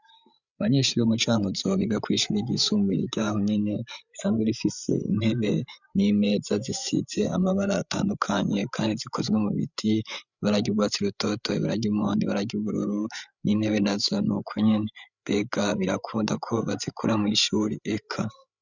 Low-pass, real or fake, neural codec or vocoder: 7.2 kHz; fake; codec, 16 kHz, 8 kbps, FreqCodec, larger model